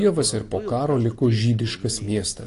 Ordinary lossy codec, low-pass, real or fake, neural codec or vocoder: AAC, 48 kbps; 10.8 kHz; real; none